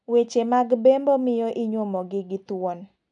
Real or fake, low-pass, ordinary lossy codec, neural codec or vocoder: real; 9.9 kHz; none; none